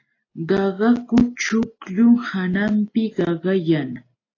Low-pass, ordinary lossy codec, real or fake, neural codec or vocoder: 7.2 kHz; AAC, 32 kbps; real; none